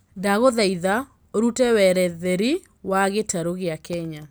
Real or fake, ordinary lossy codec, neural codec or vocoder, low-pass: real; none; none; none